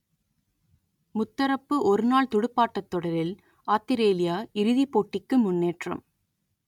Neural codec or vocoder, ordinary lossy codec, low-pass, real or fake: none; none; 19.8 kHz; real